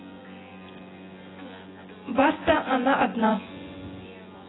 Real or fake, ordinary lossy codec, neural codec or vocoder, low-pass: fake; AAC, 16 kbps; vocoder, 24 kHz, 100 mel bands, Vocos; 7.2 kHz